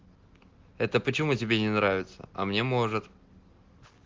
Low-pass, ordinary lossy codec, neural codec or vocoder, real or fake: 7.2 kHz; Opus, 16 kbps; none; real